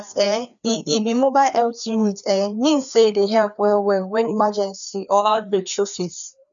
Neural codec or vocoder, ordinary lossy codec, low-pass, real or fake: codec, 16 kHz, 2 kbps, FreqCodec, larger model; none; 7.2 kHz; fake